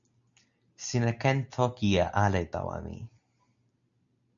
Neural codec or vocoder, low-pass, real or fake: none; 7.2 kHz; real